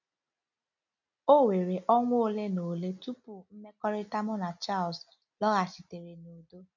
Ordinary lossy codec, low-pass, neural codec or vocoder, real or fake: none; 7.2 kHz; none; real